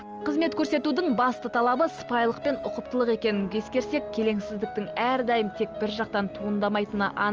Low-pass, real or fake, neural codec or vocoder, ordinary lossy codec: 7.2 kHz; real; none; Opus, 24 kbps